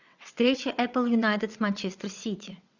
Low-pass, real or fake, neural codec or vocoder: 7.2 kHz; fake; vocoder, 22.05 kHz, 80 mel bands, WaveNeXt